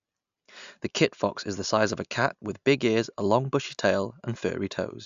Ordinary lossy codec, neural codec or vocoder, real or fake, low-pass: AAC, 96 kbps; none; real; 7.2 kHz